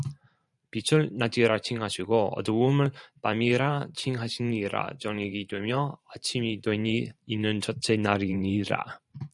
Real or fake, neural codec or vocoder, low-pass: fake; vocoder, 44.1 kHz, 128 mel bands every 512 samples, BigVGAN v2; 10.8 kHz